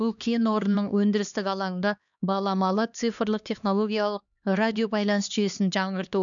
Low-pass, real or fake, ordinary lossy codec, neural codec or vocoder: 7.2 kHz; fake; none; codec, 16 kHz, 2 kbps, X-Codec, HuBERT features, trained on LibriSpeech